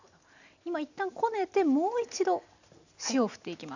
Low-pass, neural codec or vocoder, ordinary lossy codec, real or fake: 7.2 kHz; none; none; real